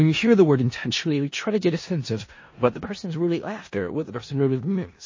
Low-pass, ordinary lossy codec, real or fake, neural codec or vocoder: 7.2 kHz; MP3, 32 kbps; fake; codec, 16 kHz in and 24 kHz out, 0.4 kbps, LongCat-Audio-Codec, four codebook decoder